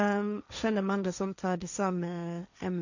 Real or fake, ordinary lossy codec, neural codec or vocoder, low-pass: fake; none; codec, 16 kHz, 1.1 kbps, Voila-Tokenizer; 7.2 kHz